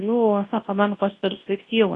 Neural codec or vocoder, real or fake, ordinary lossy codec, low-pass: codec, 24 kHz, 0.9 kbps, WavTokenizer, large speech release; fake; AAC, 32 kbps; 10.8 kHz